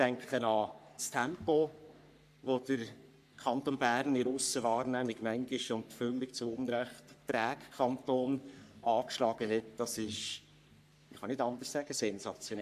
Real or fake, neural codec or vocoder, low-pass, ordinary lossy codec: fake; codec, 44.1 kHz, 3.4 kbps, Pupu-Codec; 14.4 kHz; AAC, 96 kbps